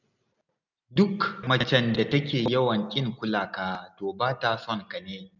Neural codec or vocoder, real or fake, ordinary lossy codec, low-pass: none; real; none; 7.2 kHz